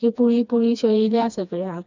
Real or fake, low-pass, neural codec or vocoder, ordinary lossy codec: fake; 7.2 kHz; codec, 16 kHz, 2 kbps, FreqCodec, smaller model; none